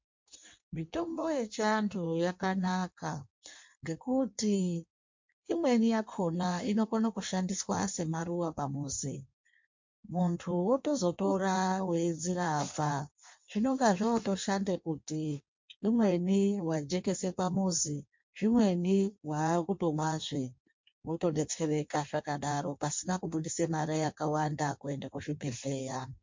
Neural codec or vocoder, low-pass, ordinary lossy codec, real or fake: codec, 16 kHz in and 24 kHz out, 1.1 kbps, FireRedTTS-2 codec; 7.2 kHz; MP3, 48 kbps; fake